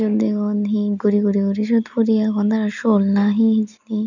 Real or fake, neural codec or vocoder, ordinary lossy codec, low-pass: real; none; none; 7.2 kHz